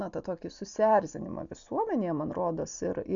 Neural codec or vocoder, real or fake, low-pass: none; real; 7.2 kHz